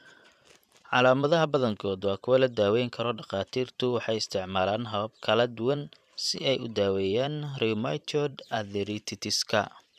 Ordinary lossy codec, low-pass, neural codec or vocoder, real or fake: none; 14.4 kHz; vocoder, 44.1 kHz, 128 mel bands every 512 samples, BigVGAN v2; fake